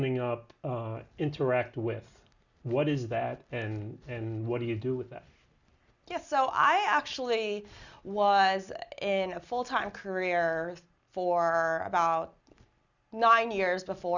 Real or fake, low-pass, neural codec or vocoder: real; 7.2 kHz; none